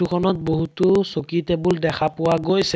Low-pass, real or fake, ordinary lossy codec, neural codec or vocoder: none; real; none; none